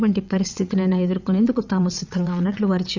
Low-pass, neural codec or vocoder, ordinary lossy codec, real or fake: 7.2 kHz; vocoder, 22.05 kHz, 80 mel bands, WaveNeXt; none; fake